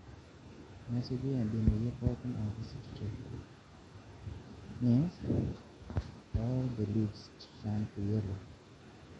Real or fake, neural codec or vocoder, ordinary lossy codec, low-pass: fake; autoencoder, 48 kHz, 128 numbers a frame, DAC-VAE, trained on Japanese speech; MP3, 48 kbps; 19.8 kHz